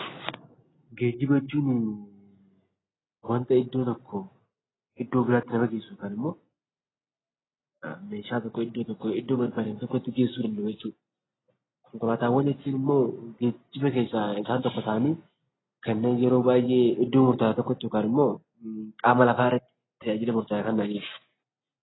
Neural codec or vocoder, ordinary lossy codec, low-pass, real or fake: none; AAC, 16 kbps; 7.2 kHz; real